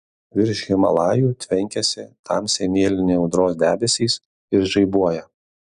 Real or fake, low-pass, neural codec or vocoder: real; 10.8 kHz; none